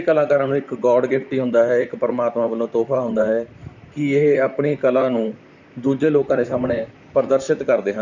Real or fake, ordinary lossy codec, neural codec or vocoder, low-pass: fake; none; vocoder, 44.1 kHz, 128 mel bands, Pupu-Vocoder; 7.2 kHz